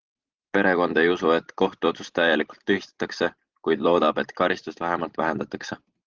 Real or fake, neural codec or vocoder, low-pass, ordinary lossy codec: real; none; 7.2 kHz; Opus, 16 kbps